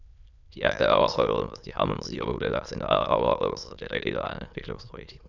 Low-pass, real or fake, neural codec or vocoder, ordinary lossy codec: 7.2 kHz; fake; autoencoder, 22.05 kHz, a latent of 192 numbers a frame, VITS, trained on many speakers; none